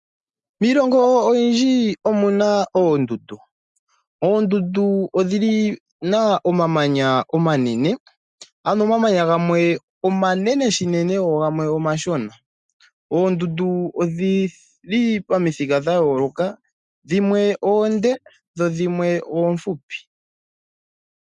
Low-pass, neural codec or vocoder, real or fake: 10.8 kHz; none; real